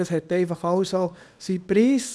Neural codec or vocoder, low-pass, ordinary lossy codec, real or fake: codec, 24 kHz, 0.9 kbps, WavTokenizer, small release; none; none; fake